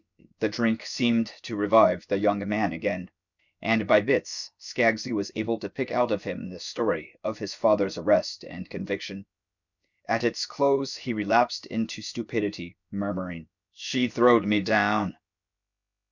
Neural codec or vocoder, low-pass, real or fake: codec, 16 kHz, about 1 kbps, DyCAST, with the encoder's durations; 7.2 kHz; fake